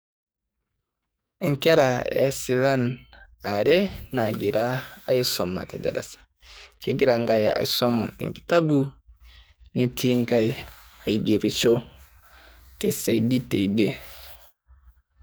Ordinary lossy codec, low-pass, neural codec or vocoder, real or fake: none; none; codec, 44.1 kHz, 2.6 kbps, SNAC; fake